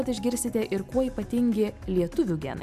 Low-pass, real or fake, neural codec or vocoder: 14.4 kHz; real; none